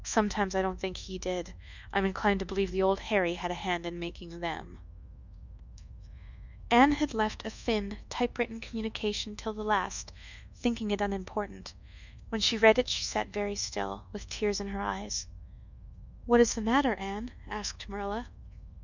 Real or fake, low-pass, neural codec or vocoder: fake; 7.2 kHz; codec, 24 kHz, 1.2 kbps, DualCodec